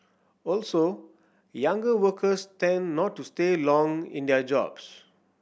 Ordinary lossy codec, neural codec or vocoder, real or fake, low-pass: none; none; real; none